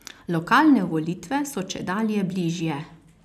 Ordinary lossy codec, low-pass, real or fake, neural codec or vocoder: none; 14.4 kHz; real; none